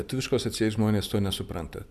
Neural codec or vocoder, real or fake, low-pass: vocoder, 44.1 kHz, 128 mel bands every 256 samples, BigVGAN v2; fake; 14.4 kHz